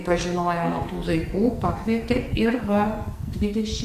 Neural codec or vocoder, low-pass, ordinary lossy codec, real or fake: codec, 44.1 kHz, 2.6 kbps, SNAC; 14.4 kHz; Opus, 64 kbps; fake